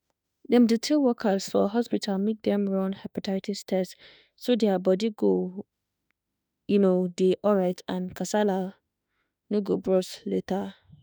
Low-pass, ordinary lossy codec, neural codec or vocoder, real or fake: none; none; autoencoder, 48 kHz, 32 numbers a frame, DAC-VAE, trained on Japanese speech; fake